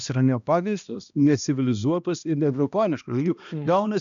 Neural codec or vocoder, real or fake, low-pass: codec, 16 kHz, 1 kbps, X-Codec, HuBERT features, trained on balanced general audio; fake; 7.2 kHz